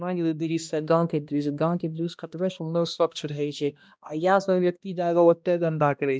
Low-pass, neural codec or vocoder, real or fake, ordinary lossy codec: none; codec, 16 kHz, 1 kbps, X-Codec, HuBERT features, trained on balanced general audio; fake; none